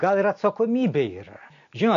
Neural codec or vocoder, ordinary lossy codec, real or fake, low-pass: none; MP3, 48 kbps; real; 7.2 kHz